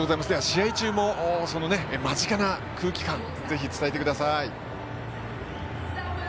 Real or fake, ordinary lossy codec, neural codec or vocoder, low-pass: real; none; none; none